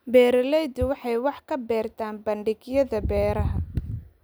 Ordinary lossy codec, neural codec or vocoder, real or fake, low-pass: none; none; real; none